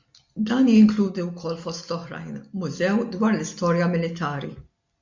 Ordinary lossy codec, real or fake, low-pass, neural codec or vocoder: MP3, 64 kbps; real; 7.2 kHz; none